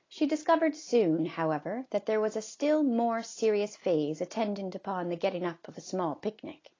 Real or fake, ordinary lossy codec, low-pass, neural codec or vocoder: real; AAC, 32 kbps; 7.2 kHz; none